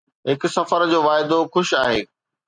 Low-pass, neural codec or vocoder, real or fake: 9.9 kHz; none; real